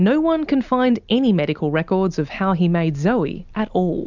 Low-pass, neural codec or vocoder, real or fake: 7.2 kHz; none; real